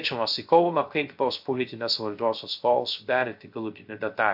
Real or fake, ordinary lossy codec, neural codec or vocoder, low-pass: fake; AAC, 48 kbps; codec, 16 kHz, 0.3 kbps, FocalCodec; 5.4 kHz